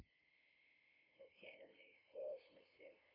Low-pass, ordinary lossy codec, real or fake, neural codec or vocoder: 5.4 kHz; AAC, 24 kbps; fake; codec, 16 kHz, 0.5 kbps, FunCodec, trained on LibriTTS, 25 frames a second